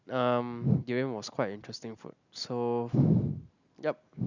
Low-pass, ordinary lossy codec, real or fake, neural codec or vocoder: 7.2 kHz; none; real; none